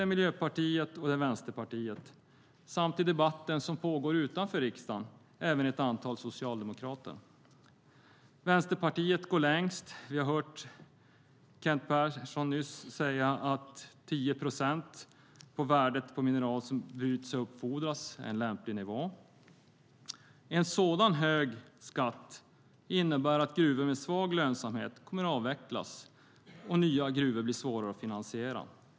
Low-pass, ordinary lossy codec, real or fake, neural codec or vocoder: none; none; real; none